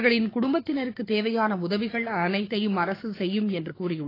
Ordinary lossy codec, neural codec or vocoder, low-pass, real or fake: AAC, 24 kbps; codec, 16 kHz, 6 kbps, DAC; 5.4 kHz; fake